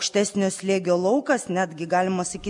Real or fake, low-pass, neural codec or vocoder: real; 10.8 kHz; none